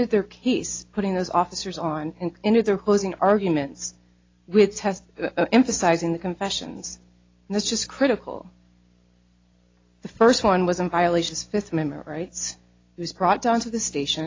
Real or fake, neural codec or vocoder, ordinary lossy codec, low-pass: real; none; AAC, 32 kbps; 7.2 kHz